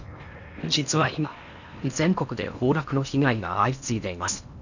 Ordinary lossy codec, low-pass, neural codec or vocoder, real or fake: none; 7.2 kHz; codec, 16 kHz in and 24 kHz out, 0.8 kbps, FocalCodec, streaming, 65536 codes; fake